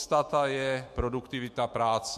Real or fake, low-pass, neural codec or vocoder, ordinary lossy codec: fake; 14.4 kHz; autoencoder, 48 kHz, 128 numbers a frame, DAC-VAE, trained on Japanese speech; MP3, 64 kbps